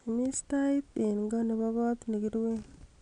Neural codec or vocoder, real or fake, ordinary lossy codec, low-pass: none; real; none; 9.9 kHz